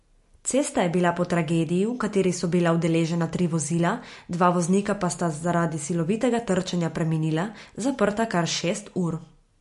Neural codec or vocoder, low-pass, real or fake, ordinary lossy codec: none; 14.4 kHz; real; MP3, 48 kbps